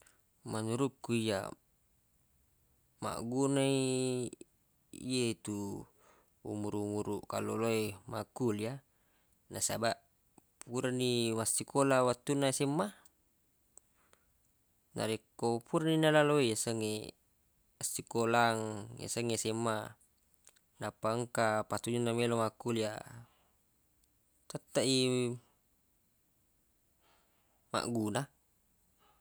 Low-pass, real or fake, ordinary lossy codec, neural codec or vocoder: none; real; none; none